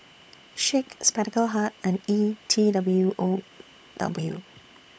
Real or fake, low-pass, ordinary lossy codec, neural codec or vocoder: fake; none; none; codec, 16 kHz, 16 kbps, FunCodec, trained on LibriTTS, 50 frames a second